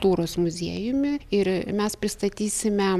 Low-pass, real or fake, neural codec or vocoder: 14.4 kHz; real; none